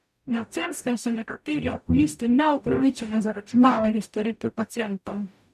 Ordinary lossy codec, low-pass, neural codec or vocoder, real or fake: none; 14.4 kHz; codec, 44.1 kHz, 0.9 kbps, DAC; fake